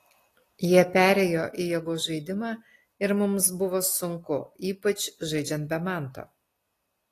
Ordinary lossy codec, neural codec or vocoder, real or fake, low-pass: AAC, 48 kbps; none; real; 14.4 kHz